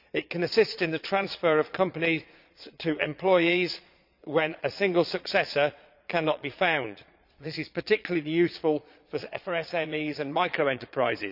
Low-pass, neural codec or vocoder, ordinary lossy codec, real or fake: 5.4 kHz; vocoder, 22.05 kHz, 80 mel bands, Vocos; none; fake